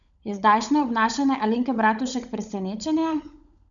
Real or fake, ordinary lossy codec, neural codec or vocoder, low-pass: fake; none; codec, 16 kHz, 16 kbps, FunCodec, trained on LibriTTS, 50 frames a second; 7.2 kHz